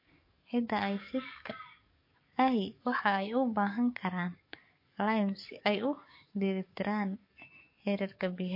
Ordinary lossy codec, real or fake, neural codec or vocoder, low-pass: MP3, 32 kbps; fake; codec, 44.1 kHz, 7.8 kbps, DAC; 5.4 kHz